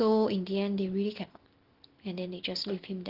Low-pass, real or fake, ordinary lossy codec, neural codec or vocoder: 5.4 kHz; real; Opus, 16 kbps; none